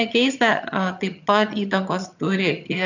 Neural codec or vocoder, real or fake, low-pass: vocoder, 22.05 kHz, 80 mel bands, HiFi-GAN; fake; 7.2 kHz